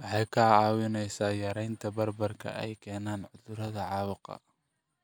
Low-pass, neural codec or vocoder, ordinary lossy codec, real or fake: none; none; none; real